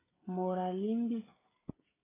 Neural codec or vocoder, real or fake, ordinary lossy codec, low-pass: codec, 16 kHz, 16 kbps, FreqCodec, smaller model; fake; AAC, 16 kbps; 3.6 kHz